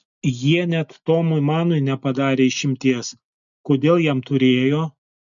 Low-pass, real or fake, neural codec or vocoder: 7.2 kHz; real; none